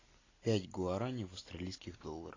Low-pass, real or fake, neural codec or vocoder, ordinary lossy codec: 7.2 kHz; real; none; AAC, 32 kbps